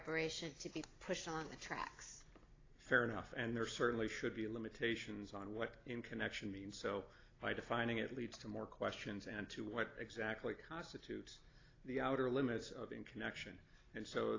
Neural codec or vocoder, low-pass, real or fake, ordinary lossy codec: none; 7.2 kHz; real; AAC, 32 kbps